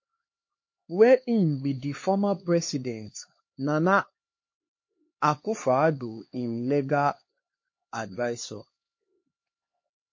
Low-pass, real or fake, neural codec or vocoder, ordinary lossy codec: 7.2 kHz; fake; codec, 16 kHz, 2 kbps, X-Codec, HuBERT features, trained on LibriSpeech; MP3, 32 kbps